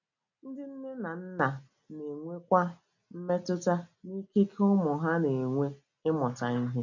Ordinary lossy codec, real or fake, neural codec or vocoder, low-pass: none; real; none; 7.2 kHz